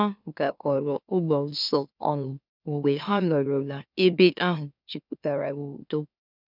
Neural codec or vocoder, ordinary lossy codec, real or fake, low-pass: autoencoder, 44.1 kHz, a latent of 192 numbers a frame, MeloTTS; none; fake; 5.4 kHz